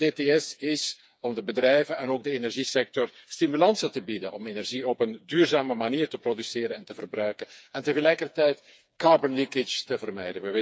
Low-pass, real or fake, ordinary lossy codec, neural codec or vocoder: none; fake; none; codec, 16 kHz, 4 kbps, FreqCodec, smaller model